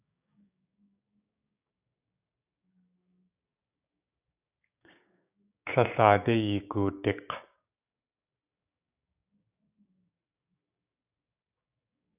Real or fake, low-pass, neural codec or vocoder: fake; 3.6 kHz; codec, 16 kHz, 6 kbps, DAC